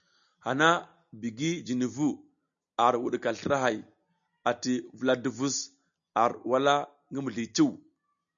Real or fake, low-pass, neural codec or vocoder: real; 7.2 kHz; none